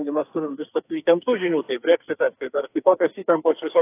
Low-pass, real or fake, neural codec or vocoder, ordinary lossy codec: 3.6 kHz; fake; codec, 44.1 kHz, 2.6 kbps, SNAC; AAC, 24 kbps